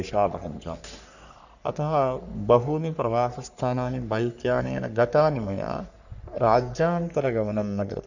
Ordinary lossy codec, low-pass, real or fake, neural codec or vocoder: none; 7.2 kHz; fake; codec, 44.1 kHz, 3.4 kbps, Pupu-Codec